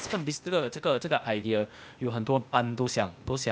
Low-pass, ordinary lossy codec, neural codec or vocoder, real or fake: none; none; codec, 16 kHz, 0.8 kbps, ZipCodec; fake